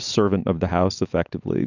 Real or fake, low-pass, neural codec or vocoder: real; 7.2 kHz; none